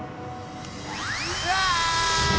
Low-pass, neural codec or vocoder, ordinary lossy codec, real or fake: none; none; none; real